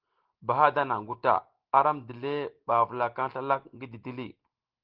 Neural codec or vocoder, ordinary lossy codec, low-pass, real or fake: none; Opus, 16 kbps; 5.4 kHz; real